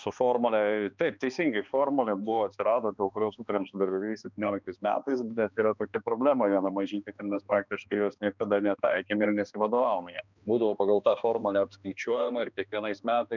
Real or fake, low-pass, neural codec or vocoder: fake; 7.2 kHz; codec, 16 kHz, 2 kbps, X-Codec, HuBERT features, trained on balanced general audio